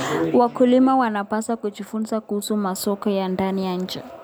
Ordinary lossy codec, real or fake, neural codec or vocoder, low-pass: none; real; none; none